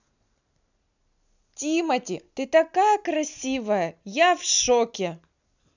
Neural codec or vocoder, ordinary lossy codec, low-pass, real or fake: none; none; 7.2 kHz; real